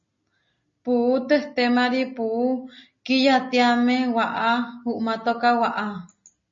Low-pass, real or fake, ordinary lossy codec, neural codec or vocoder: 7.2 kHz; real; MP3, 32 kbps; none